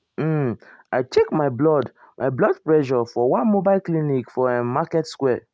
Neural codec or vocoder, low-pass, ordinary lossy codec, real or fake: none; none; none; real